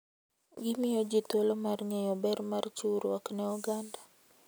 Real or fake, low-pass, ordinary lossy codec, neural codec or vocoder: real; none; none; none